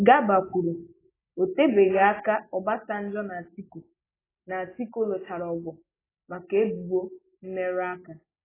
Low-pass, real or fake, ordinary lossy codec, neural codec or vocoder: 3.6 kHz; real; AAC, 16 kbps; none